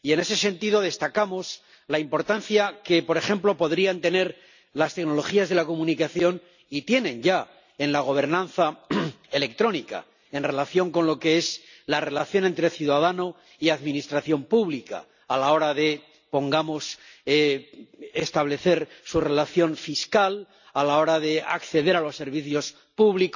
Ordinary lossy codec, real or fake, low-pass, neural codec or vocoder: none; real; 7.2 kHz; none